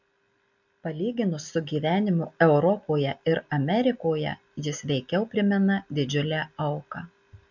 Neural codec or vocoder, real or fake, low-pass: none; real; 7.2 kHz